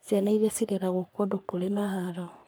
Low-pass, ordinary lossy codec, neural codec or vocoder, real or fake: none; none; codec, 44.1 kHz, 3.4 kbps, Pupu-Codec; fake